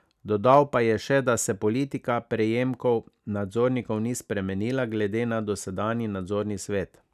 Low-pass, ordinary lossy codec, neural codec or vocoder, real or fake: 14.4 kHz; none; none; real